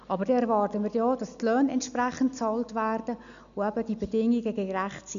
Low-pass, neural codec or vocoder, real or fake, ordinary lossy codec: 7.2 kHz; none; real; none